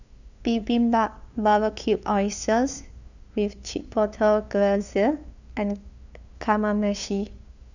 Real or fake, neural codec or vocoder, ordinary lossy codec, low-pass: fake; codec, 16 kHz, 2 kbps, FunCodec, trained on LibriTTS, 25 frames a second; none; 7.2 kHz